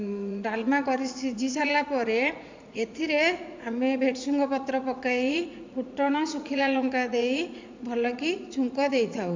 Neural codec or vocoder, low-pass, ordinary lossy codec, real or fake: vocoder, 44.1 kHz, 80 mel bands, Vocos; 7.2 kHz; MP3, 64 kbps; fake